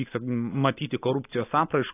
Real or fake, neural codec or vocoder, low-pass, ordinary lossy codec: real; none; 3.6 kHz; AAC, 24 kbps